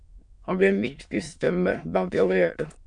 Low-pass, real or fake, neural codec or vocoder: 9.9 kHz; fake; autoencoder, 22.05 kHz, a latent of 192 numbers a frame, VITS, trained on many speakers